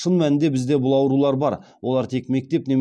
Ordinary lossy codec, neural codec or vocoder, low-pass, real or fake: none; none; none; real